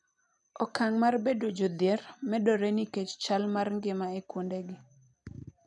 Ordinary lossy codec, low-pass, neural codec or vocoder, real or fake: none; 10.8 kHz; none; real